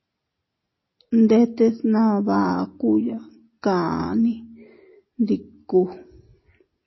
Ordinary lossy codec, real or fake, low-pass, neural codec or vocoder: MP3, 24 kbps; real; 7.2 kHz; none